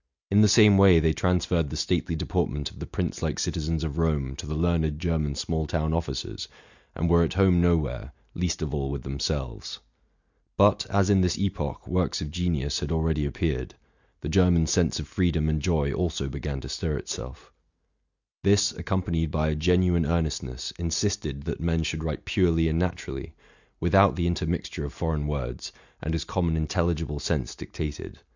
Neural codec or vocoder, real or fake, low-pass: none; real; 7.2 kHz